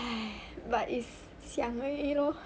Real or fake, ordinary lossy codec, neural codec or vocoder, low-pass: real; none; none; none